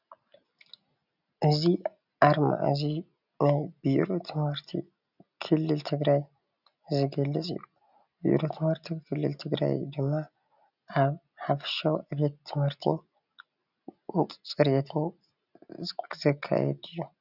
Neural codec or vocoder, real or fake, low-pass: none; real; 5.4 kHz